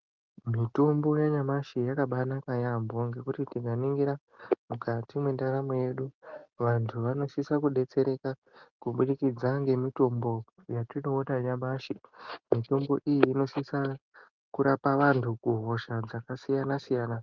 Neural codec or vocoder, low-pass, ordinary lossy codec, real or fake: none; 7.2 kHz; Opus, 32 kbps; real